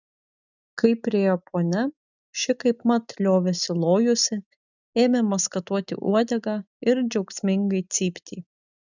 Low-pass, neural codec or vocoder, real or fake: 7.2 kHz; none; real